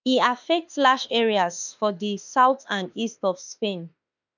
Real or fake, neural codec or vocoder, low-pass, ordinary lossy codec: fake; autoencoder, 48 kHz, 32 numbers a frame, DAC-VAE, trained on Japanese speech; 7.2 kHz; none